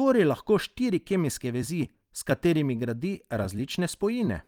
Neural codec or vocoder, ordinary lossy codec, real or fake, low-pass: vocoder, 44.1 kHz, 128 mel bands every 256 samples, BigVGAN v2; Opus, 32 kbps; fake; 19.8 kHz